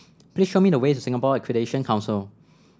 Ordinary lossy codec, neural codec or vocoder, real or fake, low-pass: none; none; real; none